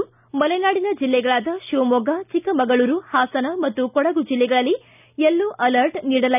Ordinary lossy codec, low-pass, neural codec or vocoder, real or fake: none; 3.6 kHz; none; real